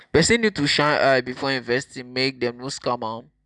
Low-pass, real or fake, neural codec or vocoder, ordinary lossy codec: 10.8 kHz; real; none; none